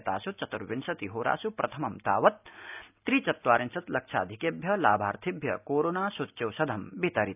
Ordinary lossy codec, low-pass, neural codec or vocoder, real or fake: none; 3.6 kHz; none; real